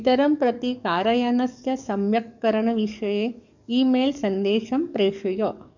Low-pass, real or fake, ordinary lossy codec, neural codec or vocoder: 7.2 kHz; fake; none; codec, 44.1 kHz, 7.8 kbps, DAC